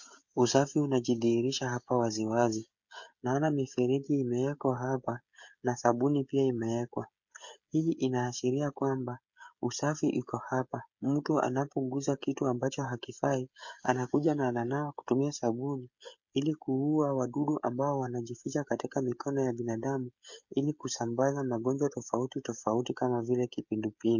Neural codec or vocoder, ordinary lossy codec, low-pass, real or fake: codec, 16 kHz, 16 kbps, FreqCodec, smaller model; MP3, 48 kbps; 7.2 kHz; fake